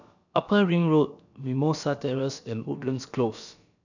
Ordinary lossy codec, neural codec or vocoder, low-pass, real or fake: none; codec, 16 kHz, about 1 kbps, DyCAST, with the encoder's durations; 7.2 kHz; fake